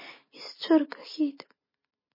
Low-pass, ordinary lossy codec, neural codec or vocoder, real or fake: 5.4 kHz; MP3, 24 kbps; none; real